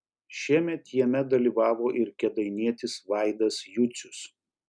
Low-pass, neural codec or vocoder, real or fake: 9.9 kHz; none; real